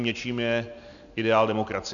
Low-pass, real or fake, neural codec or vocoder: 7.2 kHz; real; none